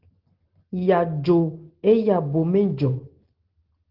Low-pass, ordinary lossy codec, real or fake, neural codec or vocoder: 5.4 kHz; Opus, 16 kbps; real; none